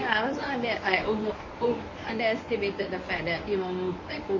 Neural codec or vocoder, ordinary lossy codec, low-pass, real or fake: codec, 24 kHz, 0.9 kbps, WavTokenizer, medium speech release version 1; MP3, 32 kbps; 7.2 kHz; fake